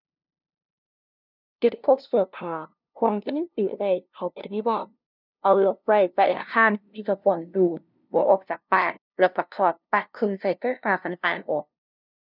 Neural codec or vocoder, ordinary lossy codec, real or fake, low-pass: codec, 16 kHz, 0.5 kbps, FunCodec, trained on LibriTTS, 25 frames a second; none; fake; 5.4 kHz